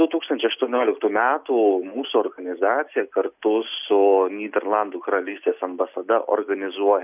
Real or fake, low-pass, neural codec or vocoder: real; 3.6 kHz; none